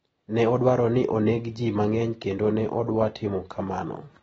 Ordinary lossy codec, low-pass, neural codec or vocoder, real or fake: AAC, 24 kbps; 19.8 kHz; none; real